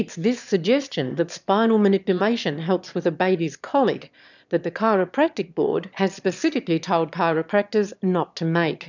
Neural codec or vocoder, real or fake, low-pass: autoencoder, 22.05 kHz, a latent of 192 numbers a frame, VITS, trained on one speaker; fake; 7.2 kHz